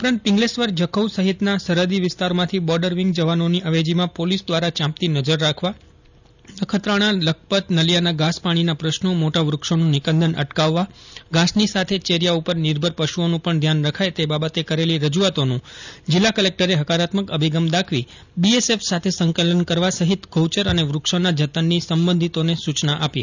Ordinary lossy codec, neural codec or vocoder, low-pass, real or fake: none; none; 7.2 kHz; real